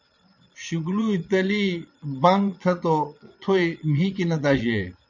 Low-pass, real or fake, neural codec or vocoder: 7.2 kHz; fake; vocoder, 22.05 kHz, 80 mel bands, Vocos